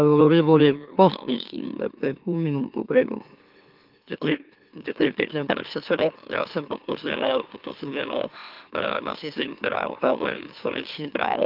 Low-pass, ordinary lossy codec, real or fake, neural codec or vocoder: 5.4 kHz; Opus, 32 kbps; fake; autoencoder, 44.1 kHz, a latent of 192 numbers a frame, MeloTTS